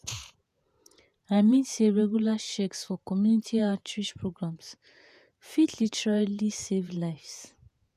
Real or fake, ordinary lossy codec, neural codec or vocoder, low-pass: fake; none; vocoder, 48 kHz, 128 mel bands, Vocos; 14.4 kHz